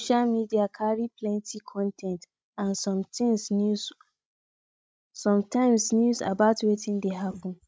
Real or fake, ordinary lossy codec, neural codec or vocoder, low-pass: fake; none; codec, 16 kHz, 16 kbps, FreqCodec, larger model; none